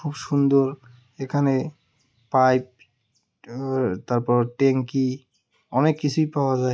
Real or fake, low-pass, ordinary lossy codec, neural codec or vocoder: real; none; none; none